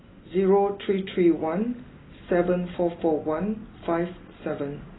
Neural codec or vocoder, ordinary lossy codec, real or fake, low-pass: none; AAC, 16 kbps; real; 7.2 kHz